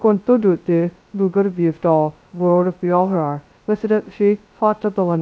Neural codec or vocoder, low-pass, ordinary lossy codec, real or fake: codec, 16 kHz, 0.2 kbps, FocalCodec; none; none; fake